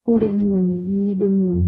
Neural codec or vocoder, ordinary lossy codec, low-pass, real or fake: codec, 44.1 kHz, 0.9 kbps, DAC; AAC, 32 kbps; 19.8 kHz; fake